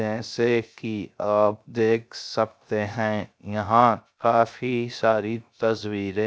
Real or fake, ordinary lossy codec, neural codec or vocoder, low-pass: fake; none; codec, 16 kHz, 0.3 kbps, FocalCodec; none